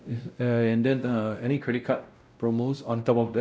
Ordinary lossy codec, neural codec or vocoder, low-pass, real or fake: none; codec, 16 kHz, 0.5 kbps, X-Codec, WavLM features, trained on Multilingual LibriSpeech; none; fake